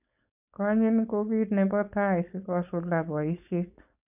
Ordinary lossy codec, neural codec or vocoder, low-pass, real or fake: none; codec, 16 kHz, 4.8 kbps, FACodec; 3.6 kHz; fake